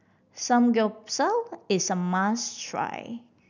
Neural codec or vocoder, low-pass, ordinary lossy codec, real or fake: none; 7.2 kHz; none; real